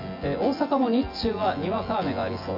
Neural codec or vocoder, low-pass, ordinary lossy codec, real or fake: vocoder, 24 kHz, 100 mel bands, Vocos; 5.4 kHz; MP3, 48 kbps; fake